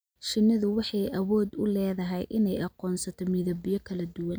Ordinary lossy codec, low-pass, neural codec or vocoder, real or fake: none; none; none; real